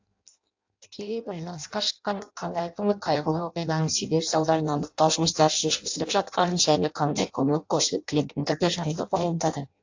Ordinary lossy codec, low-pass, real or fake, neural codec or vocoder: AAC, 48 kbps; 7.2 kHz; fake; codec, 16 kHz in and 24 kHz out, 0.6 kbps, FireRedTTS-2 codec